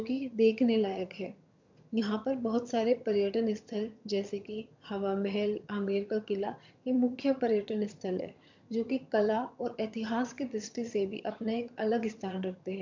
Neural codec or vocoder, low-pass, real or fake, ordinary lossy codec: vocoder, 22.05 kHz, 80 mel bands, HiFi-GAN; 7.2 kHz; fake; MP3, 64 kbps